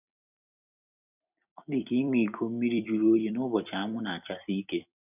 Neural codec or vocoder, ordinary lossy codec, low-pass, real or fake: vocoder, 44.1 kHz, 128 mel bands every 256 samples, BigVGAN v2; none; 3.6 kHz; fake